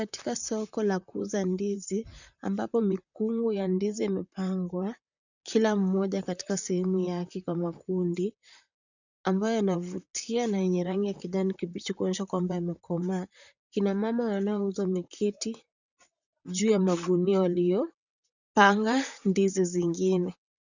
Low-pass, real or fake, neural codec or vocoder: 7.2 kHz; fake; vocoder, 44.1 kHz, 128 mel bands, Pupu-Vocoder